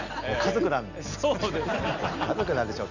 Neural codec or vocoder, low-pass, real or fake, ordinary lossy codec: none; 7.2 kHz; real; none